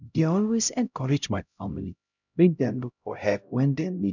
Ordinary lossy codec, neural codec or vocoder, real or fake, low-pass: none; codec, 16 kHz, 0.5 kbps, X-Codec, HuBERT features, trained on LibriSpeech; fake; 7.2 kHz